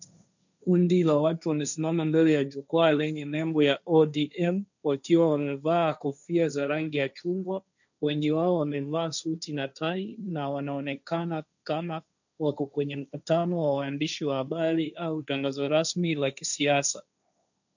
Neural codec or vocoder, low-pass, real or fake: codec, 16 kHz, 1.1 kbps, Voila-Tokenizer; 7.2 kHz; fake